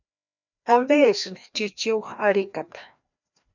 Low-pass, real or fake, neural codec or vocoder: 7.2 kHz; fake; codec, 16 kHz, 1 kbps, FreqCodec, larger model